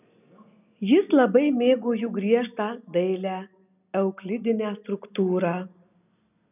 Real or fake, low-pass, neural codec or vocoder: real; 3.6 kHz; none